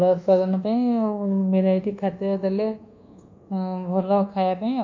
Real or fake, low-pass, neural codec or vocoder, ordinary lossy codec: fake; 7.2 kHz; codec, 24 kHz, 1.2 kbps, DualCodec; MP3, 48 kbps